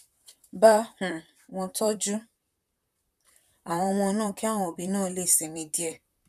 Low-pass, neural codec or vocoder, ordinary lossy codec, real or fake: 14.4 kHz; vocoder, 44.1 kHz, 128 mel bands, Pupu-Vocoder; none; fake